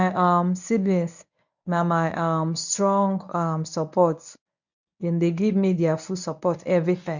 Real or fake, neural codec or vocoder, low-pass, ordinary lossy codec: fake; codec, 24 kHz, 0.9 kbps, WavTokenizer, medium speech release version 1; 7.2 kHz; none